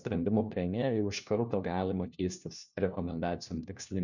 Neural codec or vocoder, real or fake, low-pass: codec, 16 kHz, 1 kbps, FunCodec, trained on LibriTTS, 50 frames a second; fake; 7.2 kHz